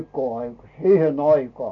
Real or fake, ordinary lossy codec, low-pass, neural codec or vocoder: real; none; 7.2 kHz; none